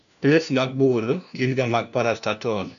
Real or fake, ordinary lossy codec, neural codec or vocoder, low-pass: fake; none; codec, 16 kHz, 1 kbps, FunCodec, trained on LibriTTS, 50 frames a second; 7.2 kHz